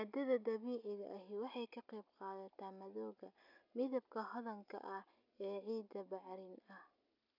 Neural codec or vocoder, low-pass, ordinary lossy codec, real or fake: none; 5.4 kHz; none; real